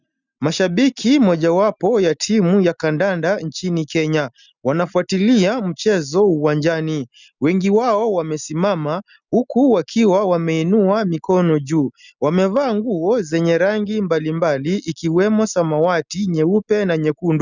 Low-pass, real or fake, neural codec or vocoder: 7.2 kHz; real; none